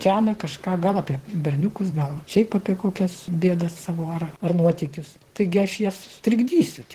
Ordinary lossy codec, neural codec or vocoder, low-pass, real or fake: Opus, 16 kbps; vocoder, 44.1 kHz, 128 mel bands, Pupu-Vocoder; 14.4 kHz; fake